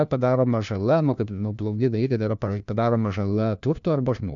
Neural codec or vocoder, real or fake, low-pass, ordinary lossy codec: codec, 16 kHz, 1 kbps, FunCodec, trained on LibriTTS, 50 frames a second; fake; 7.2 kHz; MP3, 96 kbps